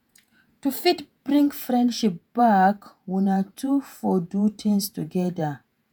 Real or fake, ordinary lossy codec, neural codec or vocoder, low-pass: fake; none; vocoder, 48 kHz, 128 mel bands, Vocos; none